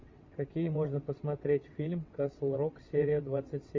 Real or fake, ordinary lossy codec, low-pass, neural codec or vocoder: fake; MP3, 64 kbps; 7.2 kHz; vocoder, 22.05 kHz, 80 mel bands, WaveNeXt